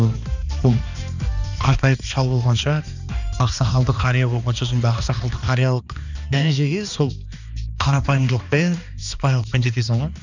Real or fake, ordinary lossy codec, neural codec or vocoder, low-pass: fake; none; codec, 16 kHz, 2 kbps, X-Codec, HuBERT features, trained on balanced general audio; 7.2 kHz